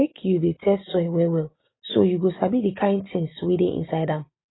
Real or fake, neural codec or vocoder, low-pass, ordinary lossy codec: real; none; 7.2 kHz; AAC, 16 kbps